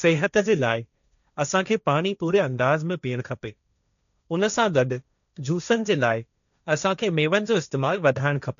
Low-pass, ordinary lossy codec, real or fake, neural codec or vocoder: 7.2 kHz; none; fake; codec, 16 kHz, 1.1 kbps, Voila-Tokenizer